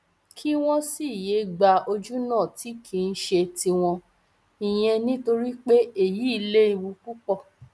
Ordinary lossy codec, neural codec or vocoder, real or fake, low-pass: none; none; real; none